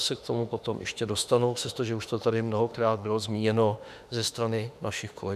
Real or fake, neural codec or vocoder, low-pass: fake; autoencoder, 48 kHz, 32 numbers a frame, DAC-VAE, trained on Japanese speech; 14.4 kHz